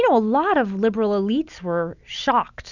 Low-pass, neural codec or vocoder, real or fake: 7.2 kHz; none; real